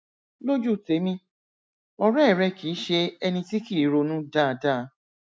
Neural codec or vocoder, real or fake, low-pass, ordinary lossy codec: none; real; none; none